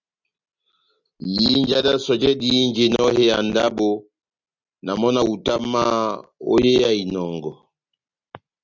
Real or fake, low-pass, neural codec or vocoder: real; 7.2 kHz; none